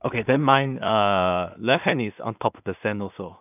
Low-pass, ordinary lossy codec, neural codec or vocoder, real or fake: 3.6 kHz; none; codec, 16 kHz in and 24 kHz out, 0.4 kbps, LongCat-Audio-Codec, two codebook decoder; fake